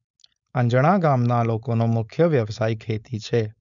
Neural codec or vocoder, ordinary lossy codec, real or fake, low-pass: codec, 16 kHz, 4.8 kbps, FACodec; none; fake; 7.2 kHz